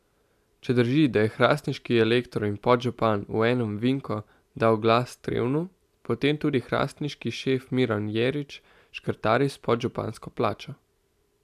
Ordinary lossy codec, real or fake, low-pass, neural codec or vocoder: AAC, 96 kbps; real; 14.4 kHz; none